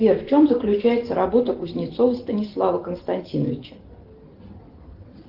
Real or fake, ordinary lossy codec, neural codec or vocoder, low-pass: real; Opus, 16 kbps; none; 5.4 kHz